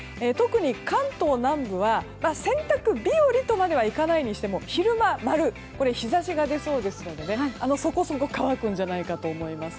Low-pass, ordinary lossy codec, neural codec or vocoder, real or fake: none; none; none; real